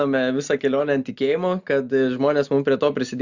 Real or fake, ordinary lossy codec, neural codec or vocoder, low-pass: real; Opus, 64 kbps; none; 7.2 kHz